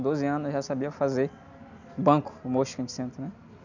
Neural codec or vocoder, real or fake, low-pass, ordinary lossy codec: none; real; 7.2 kHz; none